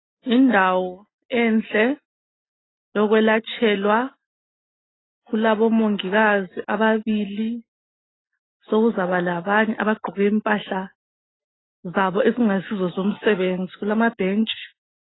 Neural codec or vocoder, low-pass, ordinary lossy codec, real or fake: none; 7.2 kHz; AAC, 16 kbps; real